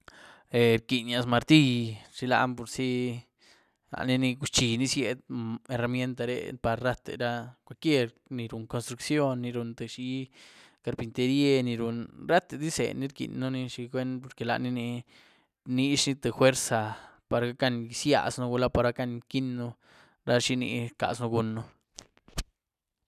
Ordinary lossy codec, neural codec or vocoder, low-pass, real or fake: none; none; 14.4 kHz; real